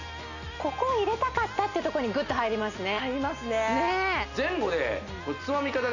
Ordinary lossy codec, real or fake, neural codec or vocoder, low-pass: none; real; none; 7.2 kHz